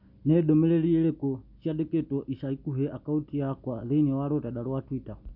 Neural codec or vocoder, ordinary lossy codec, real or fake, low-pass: none; none; real; 5.4 kHz